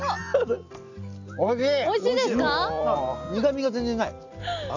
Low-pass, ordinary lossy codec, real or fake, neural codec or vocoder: 7.2 kHz; none; real; none